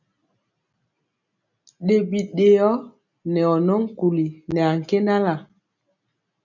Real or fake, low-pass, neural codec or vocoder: real; 7.2 kHz; none